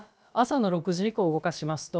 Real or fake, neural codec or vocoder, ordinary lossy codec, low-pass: fake; codec, 16 kHz, about 1 kbps, DyCAST, with the encoder's durations; none; none